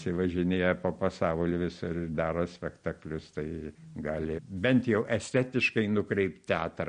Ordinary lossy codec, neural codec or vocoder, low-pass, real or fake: MP3, 48 kbps; none; 9.9 kHz; real